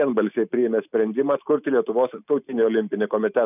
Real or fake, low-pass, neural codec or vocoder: real; 3.6 kHz; none